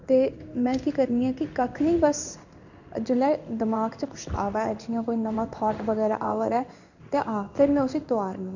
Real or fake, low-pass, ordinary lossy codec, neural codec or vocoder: fake; 7.2 kHz; none; codec, 16 kHz in and 24 kHz out, 1 kbps, XY-Tokenizer